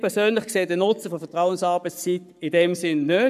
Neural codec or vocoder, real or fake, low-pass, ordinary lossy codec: codec, 44.1 kHz, 7.8 kbps, Pupu-Codec; fake; 14.4 kHz; none